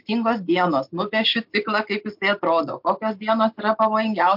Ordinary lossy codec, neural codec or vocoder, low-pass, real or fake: MP3, 48 kbps; none; 5.4 kHz; real